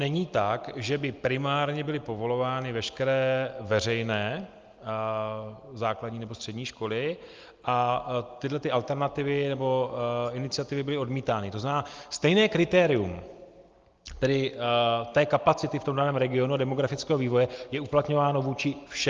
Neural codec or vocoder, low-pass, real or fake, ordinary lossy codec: none; 7.2 kHz; real; Opus, 32 kbps